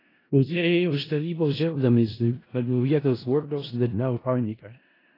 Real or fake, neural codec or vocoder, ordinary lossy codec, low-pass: fake; codec, 16 kHz in and 24 kHz out, 0.4 kbps, LongCat-Audio-Codec, four codebook decoder; AAC, 24 kbps; 5.4 kHz